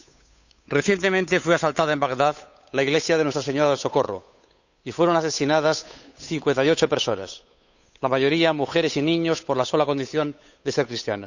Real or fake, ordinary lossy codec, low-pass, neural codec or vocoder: fake; none; 7.2 kHz; codec, 16 kHz, 8 kbps, FunCodec, trained on Chinese and English, 25 frames a second